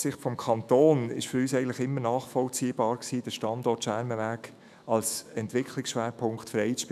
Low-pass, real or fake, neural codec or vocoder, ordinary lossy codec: 14.4 kHz; fake; autoencoder, 48 kHz, 128 numbers a frame, DAC-VAE, trained on Japanese speech; none